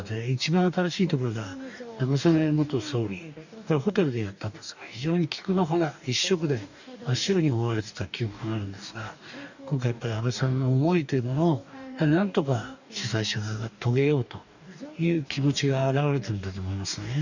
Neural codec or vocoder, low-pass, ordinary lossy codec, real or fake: codec, 44.1 kHz, 2.6 kbps, DAC; 7.2 kHz; none; fake